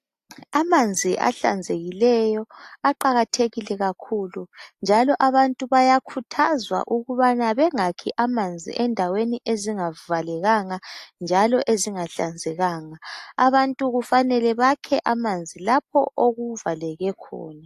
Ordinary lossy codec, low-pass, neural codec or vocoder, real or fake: AAC, 64 kbps; 14.4 kHz; none; real